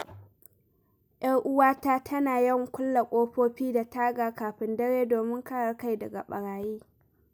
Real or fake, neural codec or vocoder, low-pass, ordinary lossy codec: real; none; none; none